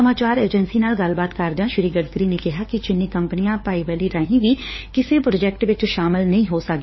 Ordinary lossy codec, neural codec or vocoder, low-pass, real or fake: MP3, 24 kbps; codec, 16 kHz, 4 kbps, FunCodec, trained on LibriTTS, 50 frames a second; 7.2 kHz; fake